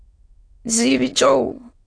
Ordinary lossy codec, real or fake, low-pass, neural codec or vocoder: AAC, 48 kbps; fake; 9.9 kHz; autoencoder, 22.05 kHz, a latent of 192 numbers a frame, VITS, trained on many speakers